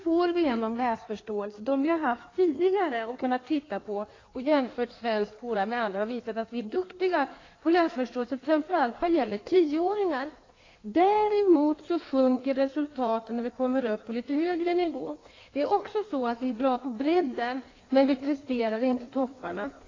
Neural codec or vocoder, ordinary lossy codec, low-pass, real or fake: codec, 16 kHz in and 24 kHz out, 1.1 kbps, FireRedTTS-2 codec; AAC, 32 kbps; 7.2 kHz; fake